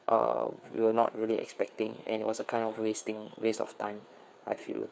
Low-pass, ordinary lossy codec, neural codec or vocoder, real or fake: none; none; codec, 16 kHz, 4 kbps, FreqCodec, larger model; fake